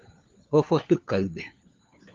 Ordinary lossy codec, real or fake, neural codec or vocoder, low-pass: Opus, 32 kbps; fake; codec, 16 kHz, 4 kbps, FunCodec, trained on LibriTTS, 50 frames a second; 7.2 kHz